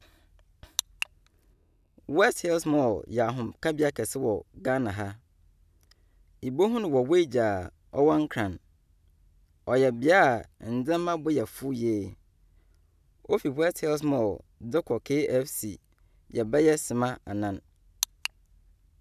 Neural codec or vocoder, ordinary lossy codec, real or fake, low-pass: none; none; real; 14.4 kHz